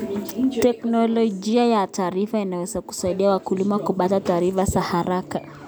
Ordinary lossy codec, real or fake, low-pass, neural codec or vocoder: none; real; none; none